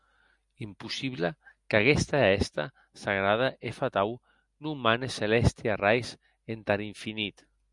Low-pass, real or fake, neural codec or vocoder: 10.8 kHz; real; none